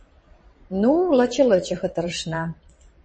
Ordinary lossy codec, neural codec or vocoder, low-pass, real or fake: MP3, 32 kbps; vocoder, 22.05 kHz, 80 mel bands, WaveNeXt; 9.9 kHz; fake